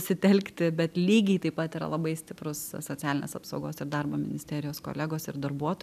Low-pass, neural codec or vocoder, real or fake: 14.4 kHz; none; real